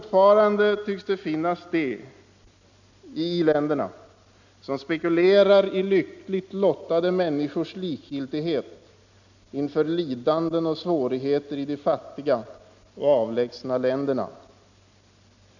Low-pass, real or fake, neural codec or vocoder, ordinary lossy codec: 7.2 kHz; real; none; none